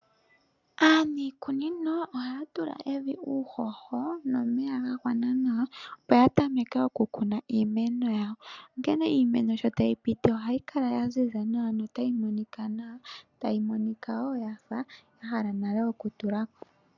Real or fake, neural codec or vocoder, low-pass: real; none; 7.2 kHz